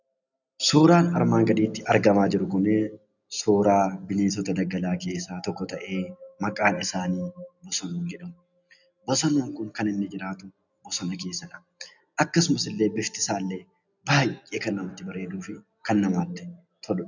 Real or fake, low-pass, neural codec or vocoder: real; 7.2 kHz; none